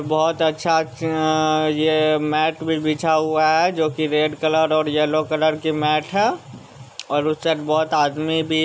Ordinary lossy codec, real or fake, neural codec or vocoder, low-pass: none; real; none; none